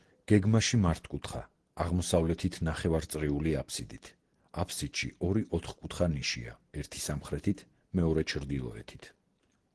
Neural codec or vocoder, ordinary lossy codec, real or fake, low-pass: none; Opus, 16 kbps; real; 10.8 kHz